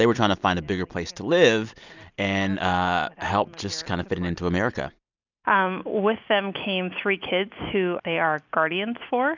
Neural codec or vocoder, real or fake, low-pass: none; real; 7.2 kHz